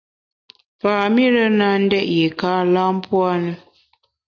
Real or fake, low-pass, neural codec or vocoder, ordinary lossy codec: real; 7.2 kHz; none; AAC, 48 kbps